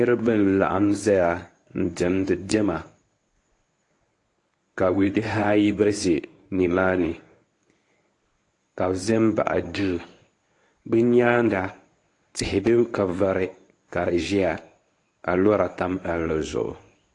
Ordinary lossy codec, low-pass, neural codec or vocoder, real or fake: AAC, 48 kbps; 10.8 kHz; codec, 24 kHz, 0.9 kbps, WavTokenizer, medium speech release version 1; fake